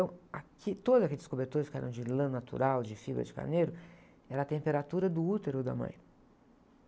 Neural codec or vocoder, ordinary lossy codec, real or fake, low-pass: none; none; real; none